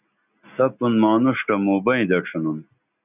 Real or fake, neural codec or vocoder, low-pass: real; none; 3.6 kHz